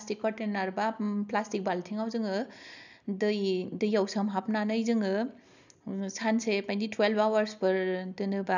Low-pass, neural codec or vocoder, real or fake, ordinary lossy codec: 7.2 kHz; none; real; none